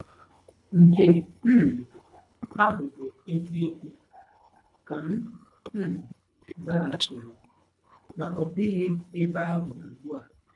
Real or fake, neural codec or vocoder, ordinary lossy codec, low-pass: fake; codec, 24 kHz, 1.5 kbps, HILCodec; none; 10.8 kHz